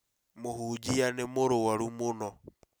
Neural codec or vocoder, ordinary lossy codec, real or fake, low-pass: none; none; real; none